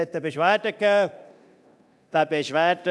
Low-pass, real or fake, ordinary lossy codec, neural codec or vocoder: none; fake; none; codec, 24 kHz, 0.9 kbps, DualCodec